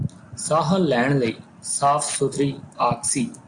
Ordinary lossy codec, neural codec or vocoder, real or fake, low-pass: AAC, 64 kbps; none; real; 9.9 kHz